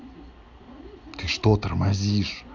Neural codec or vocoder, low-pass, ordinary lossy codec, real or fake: none; 7.2 kHz; none; real